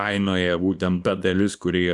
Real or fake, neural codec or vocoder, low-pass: fake; codec, 24 kHz, 0.9 kbps, WavTokenizer, small release; 10.8 kHz